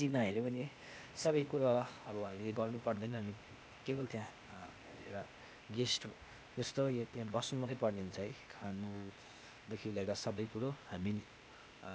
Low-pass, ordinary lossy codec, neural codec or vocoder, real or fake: none; none; codec, 16 kHz, 0.8 kbps, ZipCodec; fake